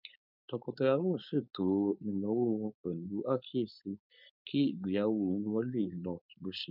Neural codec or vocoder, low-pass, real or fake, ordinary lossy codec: codec, 16 kHz, 4.8 kbps, FACodec; 5.4 kHz; fake; none